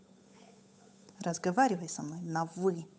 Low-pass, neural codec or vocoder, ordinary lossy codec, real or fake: none; codec, 16 kHz, 8 kbps, FunCodec, trained on Chinese and English, 25 frames a second; none; fake